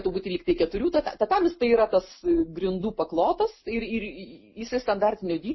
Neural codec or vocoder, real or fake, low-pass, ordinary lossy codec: none; real; 7.2 kHz; MP3, 24 kbps